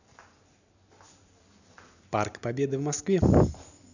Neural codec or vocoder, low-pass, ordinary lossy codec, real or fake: none; 7.2 kHz; none; real